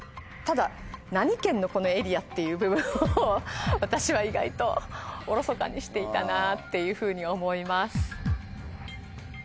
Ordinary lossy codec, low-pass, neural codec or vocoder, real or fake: none; none; none; real